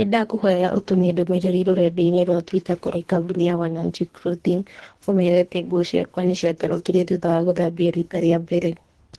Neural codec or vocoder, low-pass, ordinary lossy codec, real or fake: codec, 24 kHz, 1.5 kbps, HILCodec; 10.8 kHz; Opus, 16 kbps; fake